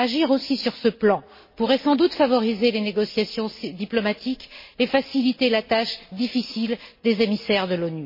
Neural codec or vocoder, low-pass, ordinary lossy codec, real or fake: none; 5.4 kHz; MP3, 24 kbps; real